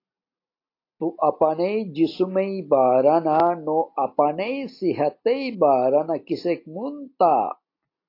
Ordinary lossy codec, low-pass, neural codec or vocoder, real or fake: AAC, 32 kbps; 5.4 kHz; none; real